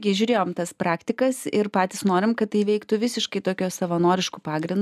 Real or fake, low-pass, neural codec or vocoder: real; 14.4 kHz; none